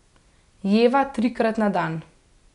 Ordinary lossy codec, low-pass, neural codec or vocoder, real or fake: none; 10.8 kHz; none; real